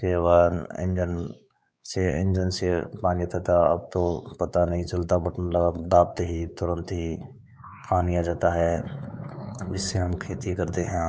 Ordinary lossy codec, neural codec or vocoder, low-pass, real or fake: none; codec, 16 kHz, 4 kbps, X-Codec, WavLM features, trained on Multilingual LibriSpeech; none; fake